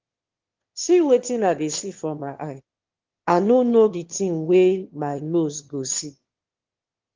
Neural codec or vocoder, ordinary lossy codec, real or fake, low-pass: autoencoder, 22.05 kHz, a latent of 192 numbers a frame, VITS, trained on one speaker; Opus, 16 kbps; fake; 7.2 kHz